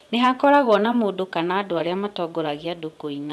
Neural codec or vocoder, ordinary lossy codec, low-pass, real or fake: vocoder, 24 kHz, 100 mel bands, Vocos; none; none; fake